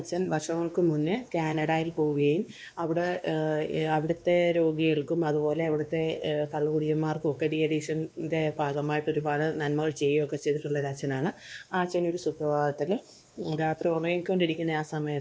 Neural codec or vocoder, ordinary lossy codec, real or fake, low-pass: codec, 16 kHz, 2 kbps, X-Codec, WavLM features, trained on Multilingual LibriSpeech; none; fake; none